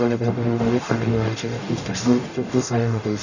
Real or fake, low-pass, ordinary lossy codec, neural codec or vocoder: fake; 7.2 kHz; none; codec, 44.1 kHz, 0.9 kbps, DAC